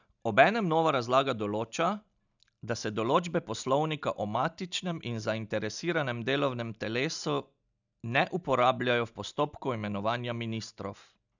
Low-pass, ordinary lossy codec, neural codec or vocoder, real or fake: 7.2 kHz; none; none; real